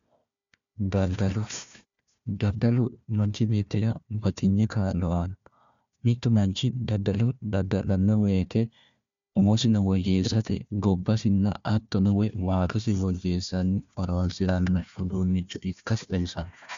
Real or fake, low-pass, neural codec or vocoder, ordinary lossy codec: fake; 7.2 kHz; codec, 16 kHz, 1 kbps, FunCodec, trained on Chinese and English, 50 frames a second; MP3, 64 kbps